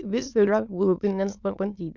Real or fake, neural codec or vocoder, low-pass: fake; autoencoder, 22.05 kHz, a latent of 192 numbers a frame, VITS, trained on many speakers; 7.2 kHz